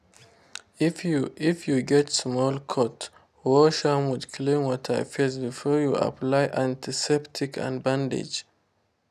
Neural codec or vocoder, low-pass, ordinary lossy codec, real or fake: none; 14.4 kHz; none; real